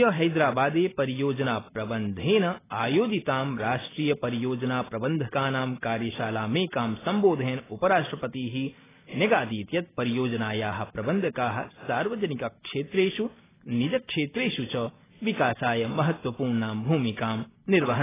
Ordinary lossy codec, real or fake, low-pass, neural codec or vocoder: AAC, 16 kbps; real; 3.6 kHz; none